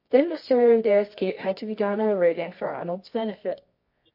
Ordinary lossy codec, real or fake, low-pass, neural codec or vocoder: MP3, 32 kbps; fake; 5.4 kHz; codec, 24 kHz, 0.9 kbps, WavTokenizer, medium music audio release